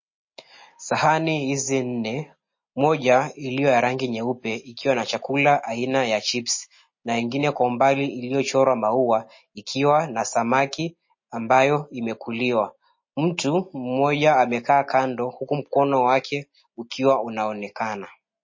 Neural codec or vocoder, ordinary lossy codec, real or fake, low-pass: none; MP3, 32 kbps; real; 7.2 kHz